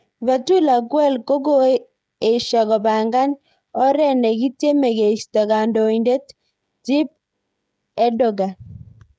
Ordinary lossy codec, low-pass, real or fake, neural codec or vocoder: none; none; fake; codec, 16 kHz, 16 kbps, FreqCodec, smaller model